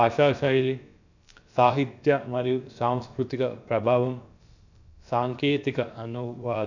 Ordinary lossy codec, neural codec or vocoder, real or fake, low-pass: none; codec, 16 kHz, about 1 kbps, DyCAST, with the encoder's durations; fake; 7.2 kHz